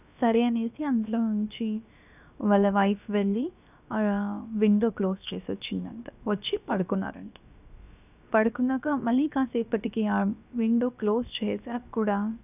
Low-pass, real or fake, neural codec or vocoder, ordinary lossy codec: 3.6 kHz; fake; codec, 16 kHz, about 1 kbps, DyCAST, with the encoder's durations; none